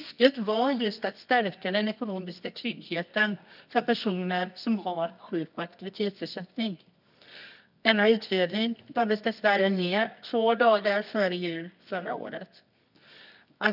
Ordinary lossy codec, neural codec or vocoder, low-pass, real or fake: none; codec, 24 kHz, 0.9 kbps, WavTokenizer, medium music audio release; 5.4 kHz; fake